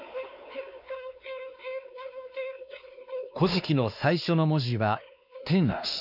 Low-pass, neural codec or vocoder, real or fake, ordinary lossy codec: 5.4 kHz; codec, 16 kHz, 2 kbps, X-Codec, WavLM features, trained on Multilingual LibriSpeech; fake; none